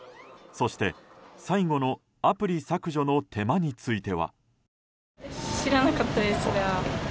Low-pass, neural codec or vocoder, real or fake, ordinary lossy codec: none; none; real; none